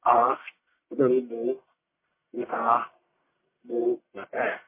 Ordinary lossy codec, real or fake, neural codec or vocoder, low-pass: MP3, 24 kbps; fake; codec, 44.1 kHz, 1.7 kbps, Pupu-Codec; 3.6 kHz